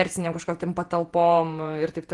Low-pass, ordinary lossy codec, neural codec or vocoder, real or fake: 10.8 kHz; Opus, 24 kbps; vocoder, 48 kHz, 128 mel bands, Vocos; fake